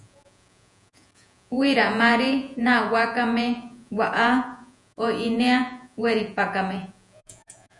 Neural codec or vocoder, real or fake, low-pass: vocoder, 48 kHz, 128 mel bands, Vocos; fake; 10.8 kHz